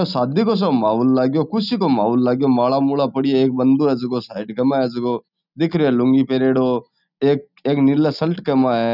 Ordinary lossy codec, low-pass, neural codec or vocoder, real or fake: none; 5.4 kHz; none; real